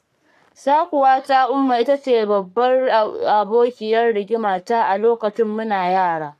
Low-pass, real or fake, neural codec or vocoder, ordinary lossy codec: 14.4 kHz; fake; codec, 44.1 kHz, 3.4 kbps, Pupu-Codec; none